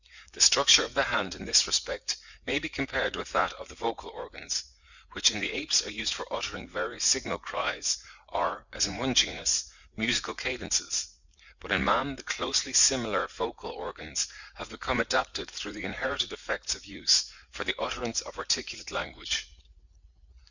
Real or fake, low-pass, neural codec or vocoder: fake; 7.2 kHz; vocoder, 44.1 kHz, 80 mel bands, Vocos